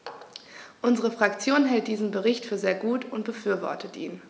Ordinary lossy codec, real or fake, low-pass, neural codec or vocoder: none; real; none; none